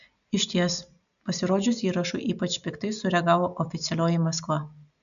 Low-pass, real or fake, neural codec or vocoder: 7.2 kHz; real; none